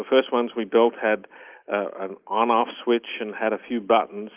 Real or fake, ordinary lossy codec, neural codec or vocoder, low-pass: real; Opus, 32 kbps; none; 3.6 kHz